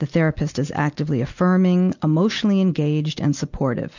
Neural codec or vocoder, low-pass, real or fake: none; 7.2 kHz; real